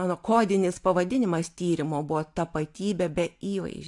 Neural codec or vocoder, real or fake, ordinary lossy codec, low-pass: none; real; AAC, 64 kbps; 10.8 kHz